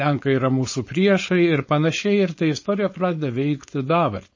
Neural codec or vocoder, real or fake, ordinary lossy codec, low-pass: codec, 16 kHz, 4.8 kbps, FACodec; fake; MP3, 32 kbps; 7.2 kHz